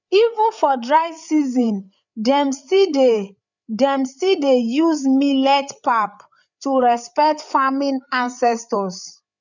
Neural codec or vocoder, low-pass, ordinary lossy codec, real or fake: codec, 16 kHz, 8 kbps, FreqCodec, larger model; 7.2 kHz; none; fake